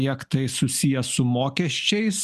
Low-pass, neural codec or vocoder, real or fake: 14.4 kHz; none; real